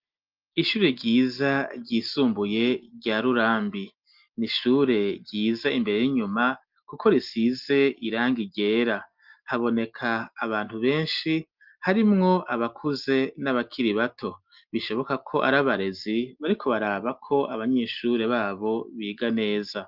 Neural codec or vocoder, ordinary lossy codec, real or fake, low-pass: none; Opus, 32 kbps; real; 5.4 kHz